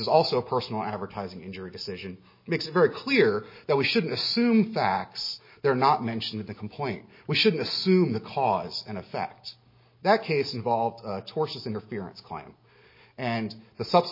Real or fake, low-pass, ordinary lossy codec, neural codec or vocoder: real; 5.4 kHz; MP3, 24 kbps; none